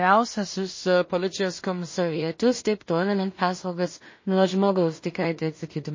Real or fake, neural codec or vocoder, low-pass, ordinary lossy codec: fake; codec, 16 kHz in and 24 kHz out, 0.4 kbps, LongCat-Audio-Codec, two codebook decoder; 7.2 kHz; MP3, 32 kbps